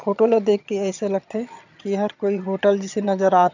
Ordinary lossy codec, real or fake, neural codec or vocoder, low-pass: none; fake; vocoder, 22.05 kHz, 80 mel bands, HiFi-GAN; 7.2 kHz